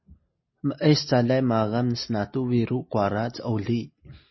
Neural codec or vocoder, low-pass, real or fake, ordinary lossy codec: none; 7.2 kHz; real; MP3, 24 kbps